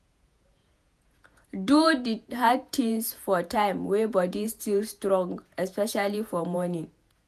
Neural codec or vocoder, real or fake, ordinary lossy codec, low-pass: vocoder, 48 kHz, 128 mel bands, Vocos; fake; none; 14.4 kHz